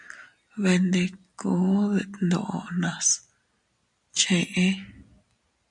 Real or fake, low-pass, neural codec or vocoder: real; 10.8 kHz; none